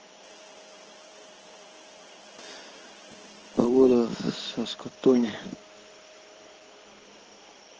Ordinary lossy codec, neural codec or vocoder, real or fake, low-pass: Opus, 16 kbps; codec, 16 kHz in and 24 kHz out, 1 kbps, XY-Tokenizer; fake; 7.2 kHz